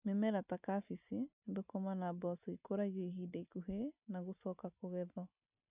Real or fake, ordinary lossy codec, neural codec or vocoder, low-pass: real; none; none; 3.6 kHz